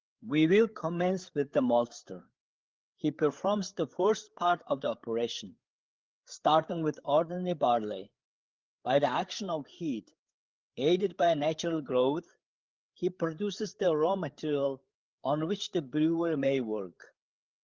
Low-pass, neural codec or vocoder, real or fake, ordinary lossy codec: 7.2 kHz; codec, 16 kHz, 8 kbps, FreqCodec, larger model; fake; Opus, 16 kbps